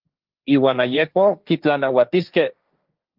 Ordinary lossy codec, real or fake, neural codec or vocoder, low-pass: Opus, 24 kbps; fake; codec, 16 kHz, 1.1 kbps, Voila-Tokenizer; 5.4 kHz